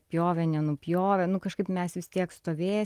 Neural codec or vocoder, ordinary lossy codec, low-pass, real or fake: none; Opus, 24 kbps; 14.4 kHz; real